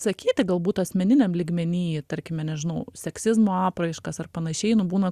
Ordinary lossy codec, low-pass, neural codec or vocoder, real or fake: Opus, 64 kbps; 14.4 kHz; none; real